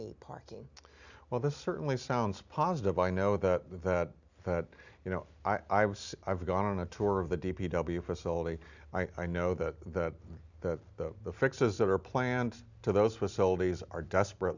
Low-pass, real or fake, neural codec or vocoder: 7.2 kHz; real; none